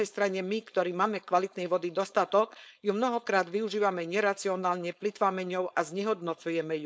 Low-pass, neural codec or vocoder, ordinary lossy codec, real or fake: none; codec, 16 kHz, 4.8 kbps, FACodec; none; fake